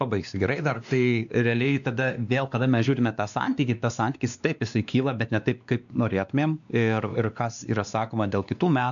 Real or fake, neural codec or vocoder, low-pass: fake; codec, 16 kHz, 2 kbps, X-Codec, WavLM features, trained on Multilingual LibriSpeech; 7.2 kHz